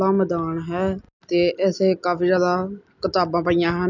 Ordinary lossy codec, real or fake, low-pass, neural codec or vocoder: none; real; 7.2 kHz; none